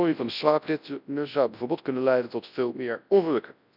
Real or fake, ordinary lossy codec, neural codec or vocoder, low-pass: fake; none; codec, 24 kHz, 0.9 kbps, WavTokenizer, large speech release; 5.4 kHz